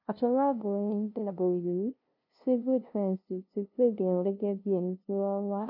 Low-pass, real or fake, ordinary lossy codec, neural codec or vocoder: 5.4 kHz; fake; none; codec, 16 kHz, 0.5 kbps, FunCodec, trained on LibriTTS, 25 frames a second